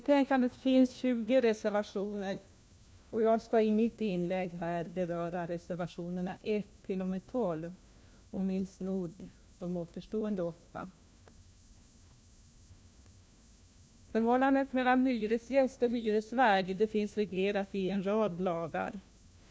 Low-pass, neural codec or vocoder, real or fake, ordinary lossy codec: none; codec, 16 kHz, 1 kbps, FunCodec, trained on LibriTTS, 50 frames a second; fake; none